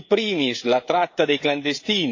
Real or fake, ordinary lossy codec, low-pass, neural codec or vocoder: fake; AAC, 48 kbps; 7.2 kHz; codec, 16 kHz, 16 kbps, FreqCodec, smaller model